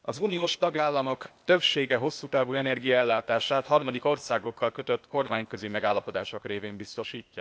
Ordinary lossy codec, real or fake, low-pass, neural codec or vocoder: none; fake; none; codec, 16 kHz, 0.8 kbps, ZipCodec